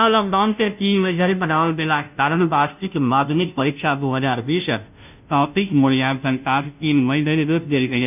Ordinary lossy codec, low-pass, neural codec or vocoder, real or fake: none; 3.6 kHz; codec, 16 kHz, 0.5 kbps, FunCodec, trained on Chinese and English, 25 frames a second; fake